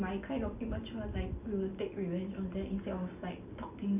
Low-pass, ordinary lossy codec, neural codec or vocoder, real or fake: 3.6 kHz; none; none; real